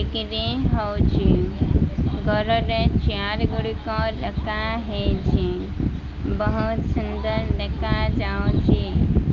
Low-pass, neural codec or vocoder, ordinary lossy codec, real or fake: 7.2 kHz; none; Opus, 24 kbps; real